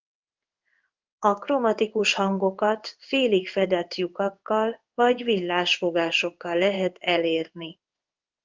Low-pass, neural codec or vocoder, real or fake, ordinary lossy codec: 7.2 kHz; codec, 16 kHz in and 24 kHz out, 1 kbps, XY-Tokenizer; fake; Opus, 24 kbps